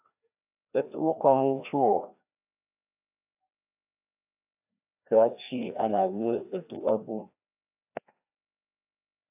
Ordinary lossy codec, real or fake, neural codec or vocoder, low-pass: AAC, 32 kbps; fake; codec, 16 kHz, 1 kbps, FreqCodec, larger model; 3.6 kHz